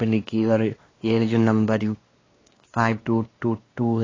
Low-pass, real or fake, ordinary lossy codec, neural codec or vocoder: 7.2 kHz; fake; AAC, 32 kbps; codec, 16 kHz, 2 kbps, X-Codec, WavLM features, trained on Multilingual LibriSpeech